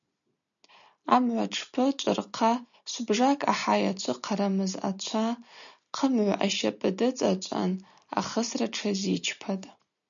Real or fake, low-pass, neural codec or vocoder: real; 7.2 kHz; none